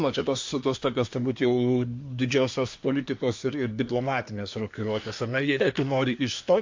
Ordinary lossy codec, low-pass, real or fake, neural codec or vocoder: MP3, 48 kbps; 7.2 kHz; fake; codec, 24 kHz, 1 kbps, SNAC